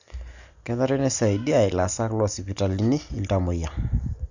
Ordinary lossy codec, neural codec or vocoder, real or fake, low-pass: none; none; real; 7.2 kHz